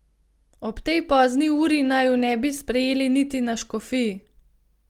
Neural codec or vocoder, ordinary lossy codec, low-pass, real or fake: none; Opus, 24 kbps; 19.8 kHz; real